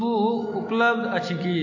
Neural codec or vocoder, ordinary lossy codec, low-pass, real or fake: none; AAC, 48 kbps; 7.2 kHz; real